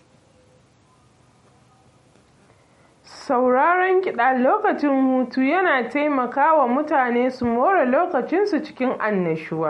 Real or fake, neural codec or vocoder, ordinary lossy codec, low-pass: real; none; MP3, 48 kbps; 19.8 kHz